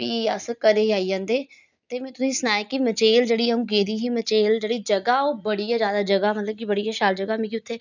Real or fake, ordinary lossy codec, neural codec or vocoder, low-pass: real; none; none; 7.2 kHz